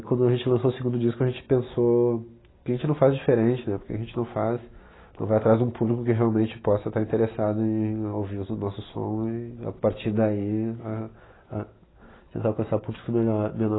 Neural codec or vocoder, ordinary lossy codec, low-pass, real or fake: none; AAC, 16 kbps; 7.2 kHz; real